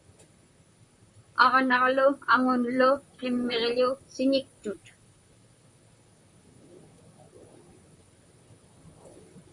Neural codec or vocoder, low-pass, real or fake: vocoder, 44.1 kHz, 128 mel bands, Pupu-Vocoder; 10.8 kHz; fake